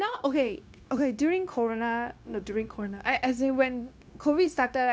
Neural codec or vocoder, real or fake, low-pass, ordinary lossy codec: codec, 16 kHz, 0.9 kbps, LongCat-Audio-Codec; fake; none; none